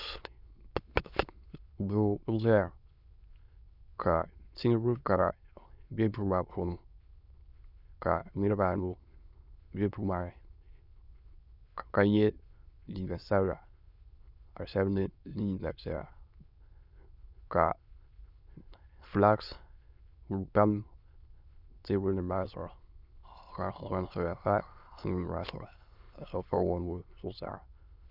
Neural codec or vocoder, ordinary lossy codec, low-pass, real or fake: autoencoder, 22.05 kHz, a latent of 192 numbers a frame, VITS, trained on many speakers; Opus, 64 kbps; 5.4 kHz; fake